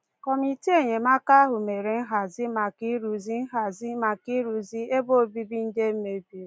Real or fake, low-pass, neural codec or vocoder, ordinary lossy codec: real; none; none; none